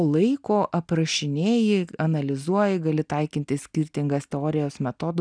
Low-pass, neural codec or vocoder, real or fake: 9.9 kHz; none; real